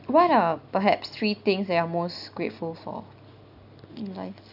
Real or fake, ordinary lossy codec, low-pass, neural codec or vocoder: real; none; 5.4 kHz; none